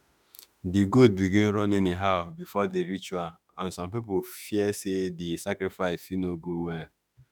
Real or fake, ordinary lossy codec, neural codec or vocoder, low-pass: fake; none; autoencoder, 48 kHz, 32 numbers a frame, DAC-VAE, trained on Japanese speech; none